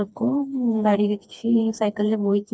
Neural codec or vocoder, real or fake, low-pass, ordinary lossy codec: codec, 16 kHz, 2 kbps, FreqCodec, smaller model; fake; none; none